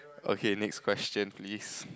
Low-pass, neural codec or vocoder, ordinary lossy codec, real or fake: none; none; none; real